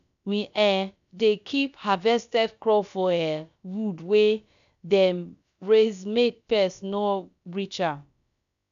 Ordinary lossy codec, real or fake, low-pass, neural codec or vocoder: none; fake; 7.2 kHz; codec, 16 kHz, about 1 kbps, DyCAST, with the encoder's durations